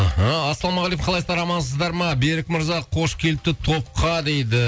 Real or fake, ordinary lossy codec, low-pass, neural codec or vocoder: real; none; none; none